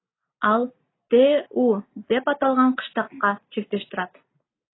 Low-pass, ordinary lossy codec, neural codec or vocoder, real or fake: 7.2 kHz; AAC, 16 kbps; none; real